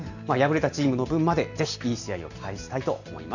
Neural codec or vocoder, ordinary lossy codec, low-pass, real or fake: none; none; 7.2 kHz; real